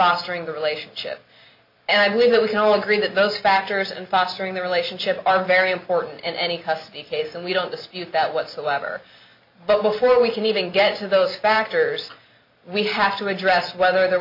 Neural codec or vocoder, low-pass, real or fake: none; 5.4 kHz; real